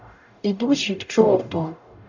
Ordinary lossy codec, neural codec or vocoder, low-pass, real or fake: none; codec, 44.1 kHz, 0.9 kbps, DAC; 7.2 kHz; fake